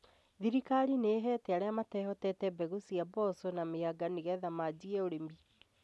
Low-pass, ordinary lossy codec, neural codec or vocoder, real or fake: none; none; none; real